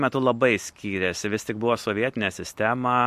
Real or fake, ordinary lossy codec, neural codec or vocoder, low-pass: real; MP3, 64 kbps; none; 14.4 kHz